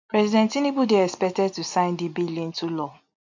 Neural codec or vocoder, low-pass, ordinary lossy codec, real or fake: none; 7.2 kHz; MP3, 64 kbps; real